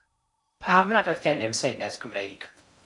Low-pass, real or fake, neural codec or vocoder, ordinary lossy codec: 10.8 kHz; fake; codec, 16 kHz in and 24 kHz out, 0.6 kbps, FocalCodec, streaming, 4096 codes; AAC, 64 kbps